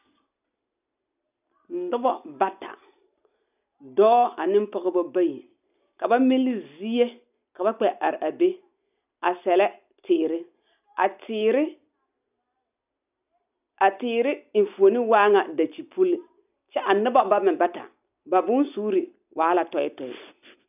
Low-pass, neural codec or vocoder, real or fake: 3.6 kHz; none; real